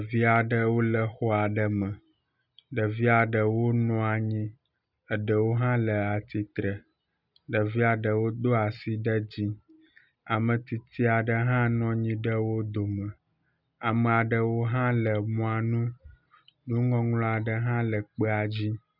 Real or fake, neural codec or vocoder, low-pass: real; none; 5.4 kHz